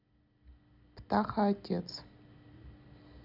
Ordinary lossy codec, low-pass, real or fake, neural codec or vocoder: none; 5.4 kHz; real; none